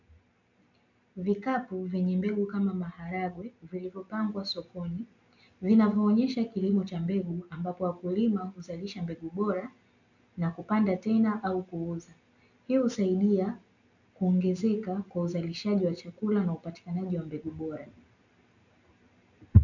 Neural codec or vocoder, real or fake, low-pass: none; real; 7.2 kHz